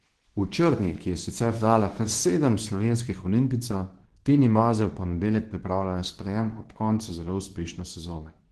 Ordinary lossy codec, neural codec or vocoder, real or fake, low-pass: Opus, 16 kbps; codec, 24 kHz, 0.9 kbps, WavTokenizer, medium speech release version 2; fake; 10.8 kHz